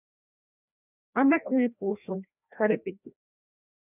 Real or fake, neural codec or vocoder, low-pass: fake; codec, 16 kHz, 1 kbps, FreqCodec, larger model; 3.6 kHz